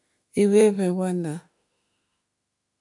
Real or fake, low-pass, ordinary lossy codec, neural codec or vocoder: fake; 10.8 kHz; MP3, 96 kbps; autoencoder, 48 kHz, 32 numbers a frame, DAC-VAE, trained on Japanese speech